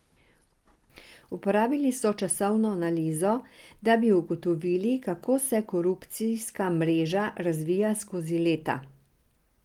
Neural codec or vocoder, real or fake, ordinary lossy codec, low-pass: none; real; Opus, 24 kbps; 19.8 kHz